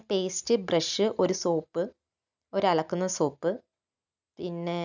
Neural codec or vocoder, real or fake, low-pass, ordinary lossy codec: none; real; 7.2 kHz; none